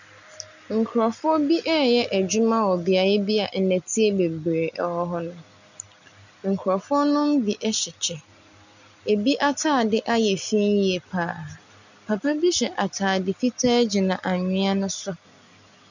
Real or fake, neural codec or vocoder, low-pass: real; none; 7.2 kHz